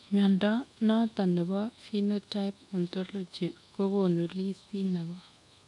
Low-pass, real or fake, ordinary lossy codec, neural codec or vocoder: 10.8 kHz; fake; none; codec, 24 kHz, 1.2 kbps, DualCodec